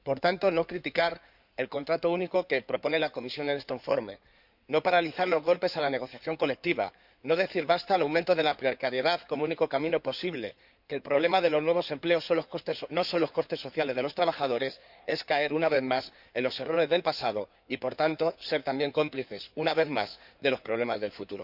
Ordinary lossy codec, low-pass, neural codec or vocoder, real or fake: none; 5.4 kHz; codec, 16 kHz in and 24 kHz out, 2.2 kbps, FireRedTTS-2 codec; fake